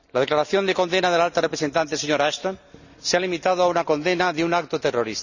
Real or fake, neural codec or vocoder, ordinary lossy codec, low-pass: real; none; none; 7.2 kHz